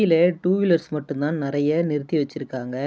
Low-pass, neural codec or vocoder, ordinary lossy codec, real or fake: none; none; none; real